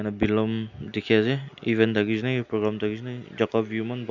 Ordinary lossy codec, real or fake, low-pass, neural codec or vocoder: none; real; 7.2 kHz; none